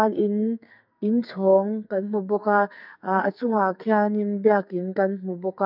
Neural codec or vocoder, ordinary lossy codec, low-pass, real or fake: codec, 44.1 kHz, 2.6 kbps, SNAC; none; 5.4 kHz; fake